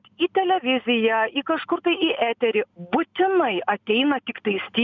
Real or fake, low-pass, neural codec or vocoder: real; 7.2 kHz; none